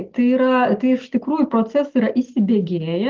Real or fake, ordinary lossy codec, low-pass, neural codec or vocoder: real; Opus, 16 kbps; 7.2 kHz; none